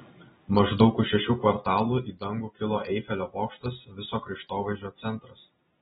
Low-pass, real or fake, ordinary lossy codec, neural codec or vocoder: 10.8 kHz; real; AAC, 16 kbps; none